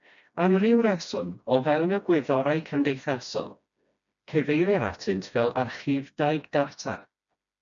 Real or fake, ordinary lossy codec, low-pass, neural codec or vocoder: fake; MP3, 64 kbps; 7.2 kHz; codec, 16 kHz, 1 kbps, FreqCodec, smaller model